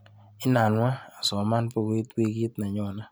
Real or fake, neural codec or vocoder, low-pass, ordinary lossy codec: real; none; none; none